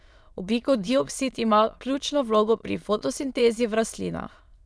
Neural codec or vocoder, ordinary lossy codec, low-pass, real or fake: autoencoder, 22.05 kHz, a latent of 192 numbers a frame, VITS, trained on many speakers; none; none; fake